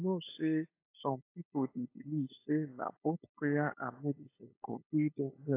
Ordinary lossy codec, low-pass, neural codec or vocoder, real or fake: AAC, 24 kbps; 3.6 kHz; codec, 16 kHz, 8 kbps, FunCodec, trained on LibriTTS, 25 frames a second; fake